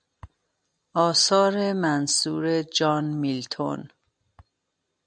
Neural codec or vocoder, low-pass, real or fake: none; 9.9 kHz; real